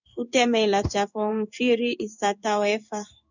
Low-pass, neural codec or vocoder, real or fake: 7.2 kHz; codec, 16 kHz in and 24 kHz out, 1 kbps, XY-Tokenizer; fake